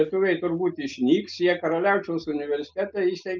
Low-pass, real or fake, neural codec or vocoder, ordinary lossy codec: 7.2 kHz; real; none; Opus, 24 kbps